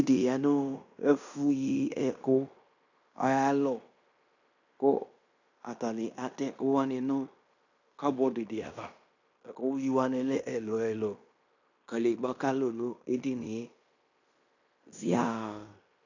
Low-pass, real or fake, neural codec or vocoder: 7.2 kHz; fake; codec, 16 kHz in and 24 kHz out, 0.9 kbps, LongCat-Audio-Codec, fine tuned four codebook decoder